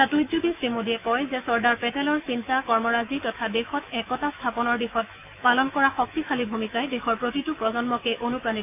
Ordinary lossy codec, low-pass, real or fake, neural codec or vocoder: AAC, 32 kbps; 3.6 kHz; fake; autoencoder, 48 kHz, 128 numbers a frame, DAC-VAE, trained on Japanese speech